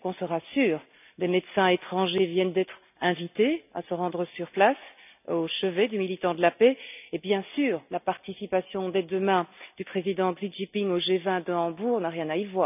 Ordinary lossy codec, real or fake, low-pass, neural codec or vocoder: none; real; 3.6 kHz; none